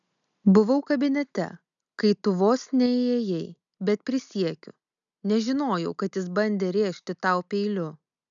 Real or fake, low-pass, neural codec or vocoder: real; 7.2 kHz; none